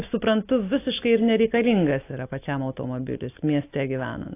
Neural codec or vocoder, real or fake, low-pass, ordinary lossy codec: none; real; 3.6 kHz; AAC, 24 kbps